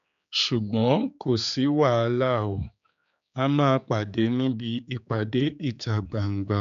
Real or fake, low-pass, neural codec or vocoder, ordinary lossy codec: fake; 7.2 kHz; codec, 16 kHz, 4 kbps, X-Codec, HuBERT features, trained on general audio; none